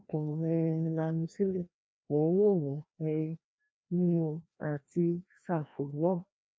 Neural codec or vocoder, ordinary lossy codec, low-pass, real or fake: codec, 16 kHz, 1 kbps, FreqCodec, larger model; none; none; fake